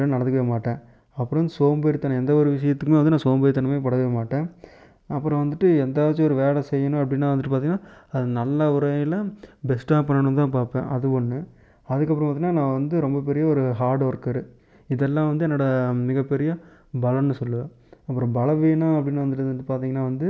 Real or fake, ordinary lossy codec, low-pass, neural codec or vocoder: real; none; none; none